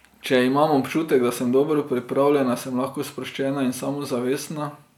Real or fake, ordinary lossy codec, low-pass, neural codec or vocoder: real; none; 19.8 kHz; none